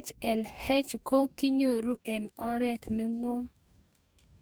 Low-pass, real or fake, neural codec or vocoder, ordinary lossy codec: none; fake; codec, 44.1 kHz, 2.6 kbps, DAC; none